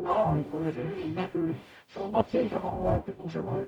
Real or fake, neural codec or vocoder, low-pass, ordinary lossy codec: fake; codec, 44.1 kHz, 0.9 kbps, DAC; 19.8 kHz; none